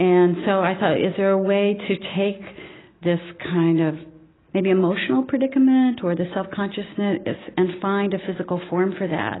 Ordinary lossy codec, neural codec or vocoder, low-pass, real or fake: AAC, 16 kbps; none; 7.2 kHz; real